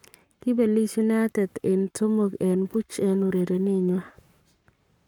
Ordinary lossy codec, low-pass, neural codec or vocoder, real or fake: none; 19.8 kHz; codec, 44.1 kHz, 7.8 kbps, Pupu-Codec; fake